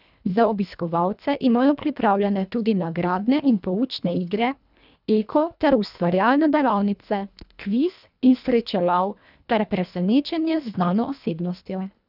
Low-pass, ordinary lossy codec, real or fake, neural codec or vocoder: 5.4 kHz; none; fake; codec, 24 kHz, 1.5 kbps, HILCodec